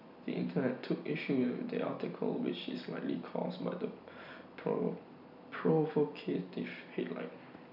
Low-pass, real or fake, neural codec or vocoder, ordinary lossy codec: 5.4 kHz; real; none; none